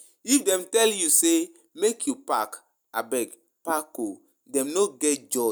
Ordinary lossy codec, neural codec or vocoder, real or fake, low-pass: none; none; real; none